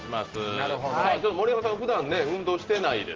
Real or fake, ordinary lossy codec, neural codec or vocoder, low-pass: real; Opus, 16 kbps; none; 7.2 kHz